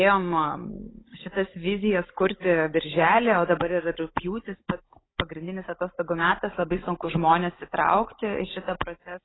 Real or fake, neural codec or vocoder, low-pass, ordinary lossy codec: fake; codec, 16 kHz, 16 kbps, FunCodec, trained on Chinese and English, 50 frames a second; 7.2 kHz; AAC, 16 kbps